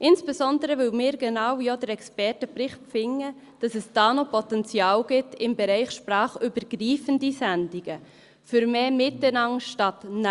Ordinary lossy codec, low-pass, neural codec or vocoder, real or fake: Opus, 64 kbps; 10.8 kHz; none; real